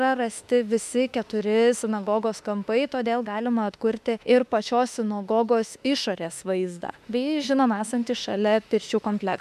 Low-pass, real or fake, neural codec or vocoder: 14.4 kHz; fake; autoencoder, 48 kHz, 32 numbers a frame, DAC-VAE, trained on Japanese speech